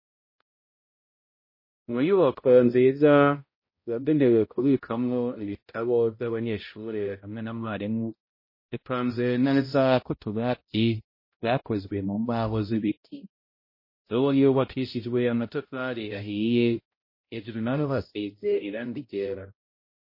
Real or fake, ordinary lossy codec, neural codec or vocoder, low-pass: fake; MP3, 24 kbps; codec, 16 kHz, 0.5 kbps, X-Codec, HuBERT features, trained on balanced general audio; 5.4 kHz